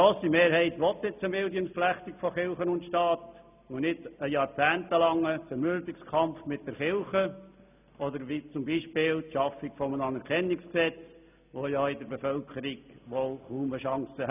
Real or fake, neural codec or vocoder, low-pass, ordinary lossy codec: real; none; 3.6 kHz; none